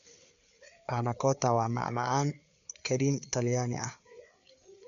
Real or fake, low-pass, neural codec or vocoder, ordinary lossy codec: fake; 7.2 kHz; codec, 16 kHz, 4 kbps, FreqCodec, larger model; none